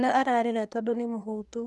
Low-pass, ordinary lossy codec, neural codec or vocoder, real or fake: none; none; codec, 24 kHz, 1 kbps, SNAC; fake